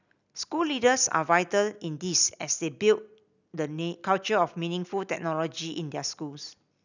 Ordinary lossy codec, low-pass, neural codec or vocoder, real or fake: none; 7.2 kHz; none; real